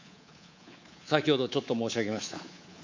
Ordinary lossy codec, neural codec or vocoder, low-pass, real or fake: MP3, 64 kbps; codec, 24 kHz, 3.1 kbps, DualCodec; 7.2 kHz; fake